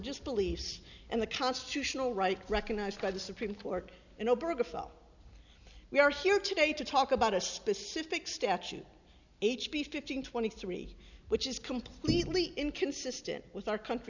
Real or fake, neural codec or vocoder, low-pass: real; none; 7.2 kHz